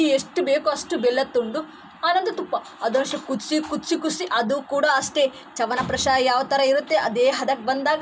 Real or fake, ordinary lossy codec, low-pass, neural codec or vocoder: real; none; none; none